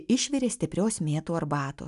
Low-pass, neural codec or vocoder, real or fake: 10.8 kHz; none; real